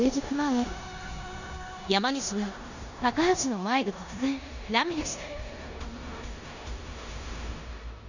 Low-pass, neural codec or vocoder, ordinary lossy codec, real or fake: 7.2 kHz; codec, 16 kHz in and 24 kHz out, 0.9 kbps, LongCat-Audio-Codec, four codebook decoder; none; fake